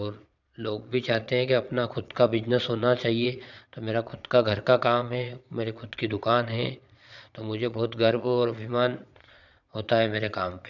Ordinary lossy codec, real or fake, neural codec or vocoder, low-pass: none; fake; vocoder, 22.05 kHz, 80 mel bands, Vocos; 7.2 kHz